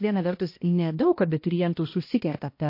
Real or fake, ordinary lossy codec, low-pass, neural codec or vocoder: fake; MP3, 32 kbps; 5.4 kHz; codec, 16 kHz, 1 kbps, X-Codec, HuBERT features, trained on balanced general audio